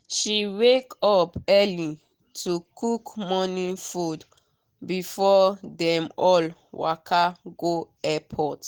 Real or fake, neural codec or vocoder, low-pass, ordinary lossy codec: real; none; 19.8 kHz; Opus, 16 kbps